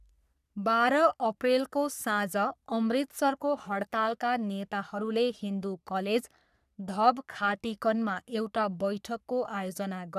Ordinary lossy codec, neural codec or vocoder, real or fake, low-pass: none; codec, 44.1 kHz, 3.4 kbps, Pupu-Codec; fake; 14.4 kHz